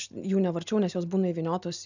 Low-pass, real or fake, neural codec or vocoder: 7.2 kHz; real; none